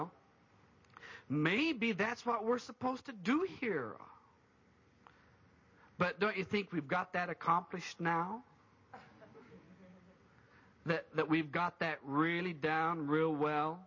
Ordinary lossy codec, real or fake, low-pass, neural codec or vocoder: MP3, 32 kbps; real; 7.2 kHz; none